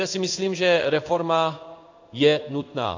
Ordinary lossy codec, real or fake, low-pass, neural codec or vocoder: AAC, 48 kbps; fake; 7.2 kHz; codec, 16 kHz in and 24 kHz out, 1 kbps, XY-Tokenizer